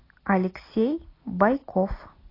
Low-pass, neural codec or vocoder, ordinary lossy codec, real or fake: 5.4 kHz; none; AAC, 24 kbps; real